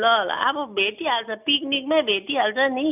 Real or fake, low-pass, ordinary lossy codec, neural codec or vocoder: real; 3.6 kHz; none; none